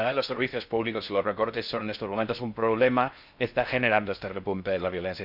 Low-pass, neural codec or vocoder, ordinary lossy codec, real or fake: 5.4 kHz; codec, 16 kHz in and 24 kHz out, 0.6 kbps, FocalCodec, streaming, 4096 codes; none; fake